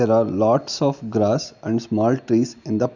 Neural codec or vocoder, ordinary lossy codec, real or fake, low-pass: none; none; real; 7.2 kHz